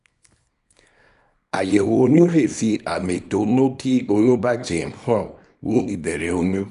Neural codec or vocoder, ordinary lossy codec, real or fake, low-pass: codec, 24 kHz, 0.9 kbps, WavTokenizer, small release; none; fake; 10.8 kHz